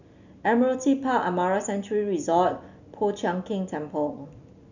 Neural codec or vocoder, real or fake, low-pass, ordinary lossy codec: none; real; 7.2 kHz; none